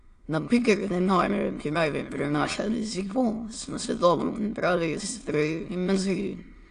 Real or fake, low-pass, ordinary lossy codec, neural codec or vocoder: fake; 9.9 kHz; AAC, 48 kbps; autoencoder, 22.05 kHz, a latent of 192 numbers a frame, VITS, trained on many speakers